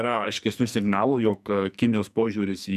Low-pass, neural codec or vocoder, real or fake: 14.4 kHz; codec, 44.1 kHz, 2.6 kbps, SNAC; fake